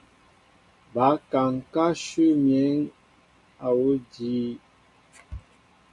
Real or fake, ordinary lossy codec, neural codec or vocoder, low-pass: real; MP3, 96 kbps; none; 10.8 kHz